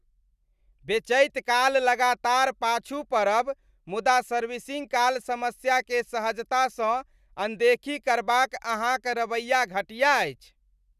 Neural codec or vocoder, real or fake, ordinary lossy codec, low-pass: none; real; none; 14.4 kHz